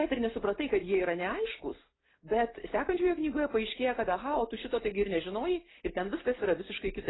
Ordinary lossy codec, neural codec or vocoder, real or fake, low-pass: AAC, 16 kbps; none; real; 7.2 kHz